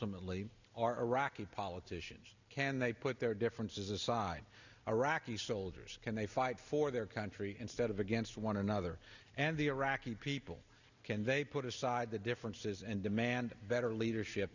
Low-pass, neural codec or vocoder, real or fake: 7.2 kHz; none; real